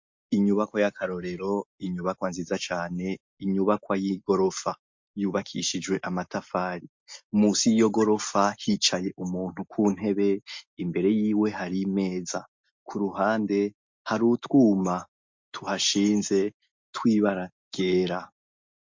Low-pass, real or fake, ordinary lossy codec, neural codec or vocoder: 7.2 kHz; real; MP3, 48 kbps; none